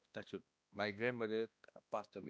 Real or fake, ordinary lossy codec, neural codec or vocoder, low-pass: fake; none; codec, 16 kHz, 1 kbps, X-Codec, HuBERT features, trained on balanced general audio; none